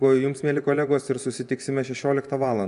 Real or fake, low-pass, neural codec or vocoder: fake; 10.8 kHz; vocoder, 24 kHz, 100 mel bands, Vocos